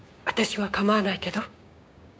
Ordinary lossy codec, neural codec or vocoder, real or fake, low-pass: none; codec, 16 kHz, 6 kbps, DAC; fake; none